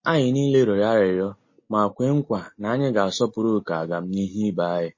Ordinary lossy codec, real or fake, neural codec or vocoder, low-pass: MP3, 32 kbps; real; none; 7.2 kHz